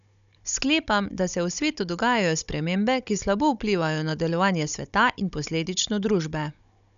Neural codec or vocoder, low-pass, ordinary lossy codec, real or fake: codec, 16 kHz, 16 kbps, FunCodec, trained on Chinese and English, 50 frames a second; 7.2 kHz; none; fake